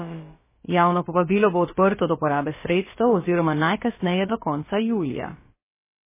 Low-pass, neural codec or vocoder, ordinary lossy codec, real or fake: 3.6 kHz; codec, 16 kHz, about 1 kbps, DyCAST, with the encoder's durations; MP3, 16 kbps; fake